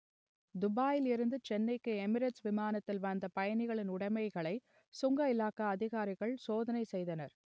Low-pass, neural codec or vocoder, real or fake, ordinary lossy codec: none; none; real; none